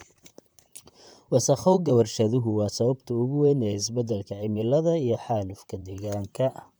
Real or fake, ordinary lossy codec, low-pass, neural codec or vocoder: fake; none; none; vocoder, 44.1 kHz, 128 mel bands, Pupu-Vocoder